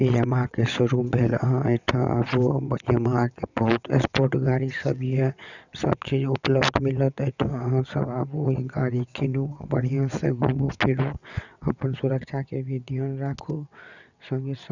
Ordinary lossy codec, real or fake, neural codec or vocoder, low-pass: none; fake; vocoder, 22.05 kHz, 80 mel bands, WaveNeXt; 7.2 kHz